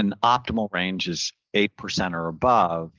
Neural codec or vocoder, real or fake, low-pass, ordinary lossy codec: none; real; 7.2 kHz; Opus, 16 kbps